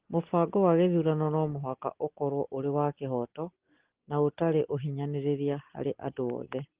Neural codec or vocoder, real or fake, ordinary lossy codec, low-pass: none; real; Opus, 16 kbps; 3.6 kHz